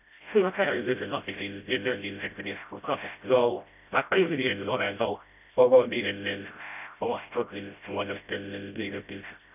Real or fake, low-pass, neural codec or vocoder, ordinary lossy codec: fake; 3.6 kHz; codec, 16 kHz, 0.5 kbps, FreqCodec, smaller model; none